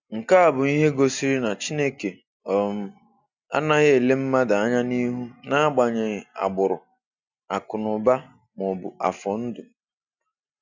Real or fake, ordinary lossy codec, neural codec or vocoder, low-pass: real; none; none; 7.2 kHz